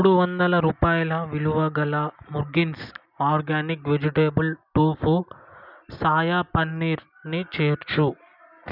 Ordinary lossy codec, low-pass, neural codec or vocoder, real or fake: none; 5.4 kHz; none; real